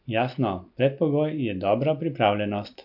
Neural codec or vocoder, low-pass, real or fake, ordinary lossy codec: none; 5.4 kHz; real; AAC, 48 kbps